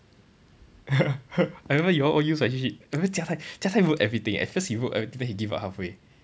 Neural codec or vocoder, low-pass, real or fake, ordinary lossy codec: none; none; real; none